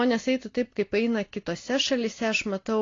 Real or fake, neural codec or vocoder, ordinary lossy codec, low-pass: real; none; AAC, 32 kbps; 7.2 kHz